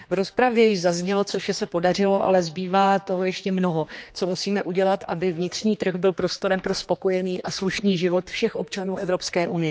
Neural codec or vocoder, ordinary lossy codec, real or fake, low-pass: codec, 16 kHz, 2 kbps, X-Codec, HuBERT features, trained on general audio; none; fake; none